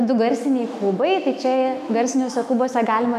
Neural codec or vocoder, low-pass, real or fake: autoencoder, 48 kHz, 128 numbers a frame, DAC-VAE, trained on Japanese speech; 14.4 kHz; fake